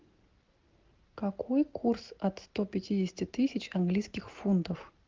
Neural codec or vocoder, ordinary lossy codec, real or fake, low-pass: none; Opus, 32 kbps; real; 7.2 kHz